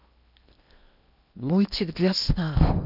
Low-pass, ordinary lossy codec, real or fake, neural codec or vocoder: 5.4 kHz; none; fake; codec, 16 kHz in and 24 kHz out, 0.8 kbps, FocalCodec, streaming, 65536 codes